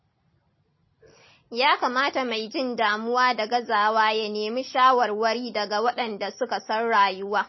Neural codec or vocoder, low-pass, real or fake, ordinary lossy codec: none; 7.2 kHz; real; MP3, 24 kbps